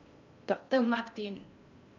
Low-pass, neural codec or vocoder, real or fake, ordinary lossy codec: 7.2 kHz; codec, 16 kHz in and 24 kHz out, 0.8 kbps, FocalCodec, streaming, 65536 codes; fake; none